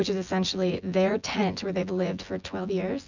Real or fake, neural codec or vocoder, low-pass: fake; vocoder, 24 kHz, 100 mel bands, Vocos; 7.2 kHz